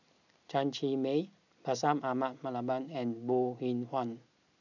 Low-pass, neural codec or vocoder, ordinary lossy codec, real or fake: 7.2 kHz; none; none; real